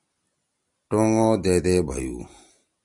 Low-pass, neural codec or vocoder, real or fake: 10.8 kHz; none; real